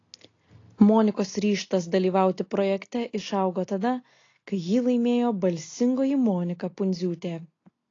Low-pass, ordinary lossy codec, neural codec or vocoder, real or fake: 7.2 kHz; AAC, 32 kbps; none; real